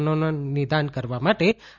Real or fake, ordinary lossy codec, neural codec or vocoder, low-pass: real; Opus, 64 kbps; none; 7.2 kHz